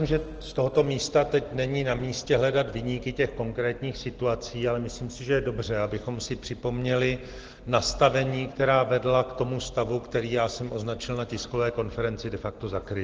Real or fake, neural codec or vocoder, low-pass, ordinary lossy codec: real; none; 7.2 kHz; Opus, 16 kbps